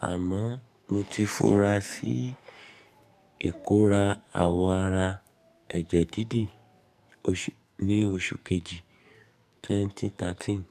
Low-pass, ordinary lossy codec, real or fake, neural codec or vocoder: 14.4 kHz; none; fake; codec, 44.1 kHz, 2.6 kbps, SNAC